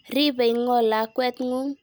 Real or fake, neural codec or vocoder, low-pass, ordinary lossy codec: real; none; none; none